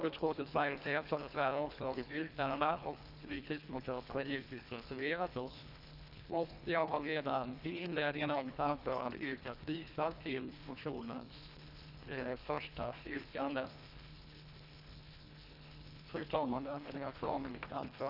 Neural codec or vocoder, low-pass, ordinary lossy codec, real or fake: codec, 24 kHz, 1.5 kbps, HILCodec; 5.4 kHz; none; fake